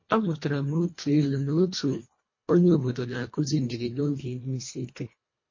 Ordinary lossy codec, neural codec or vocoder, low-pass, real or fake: MP3, 32 kbps; codec, 24 kHz, 1.5 kbps, HILCodec; 7.2 kHz; fake